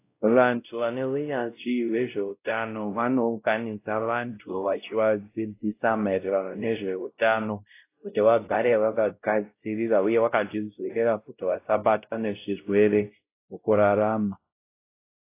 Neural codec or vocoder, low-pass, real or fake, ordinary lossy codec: codec, 16 kHz, 0.5 kbps, X-Codec, WavLM features, trained on Multilingual LibriSpeech; 3.6 kHz; fake; AAC, 24 kbps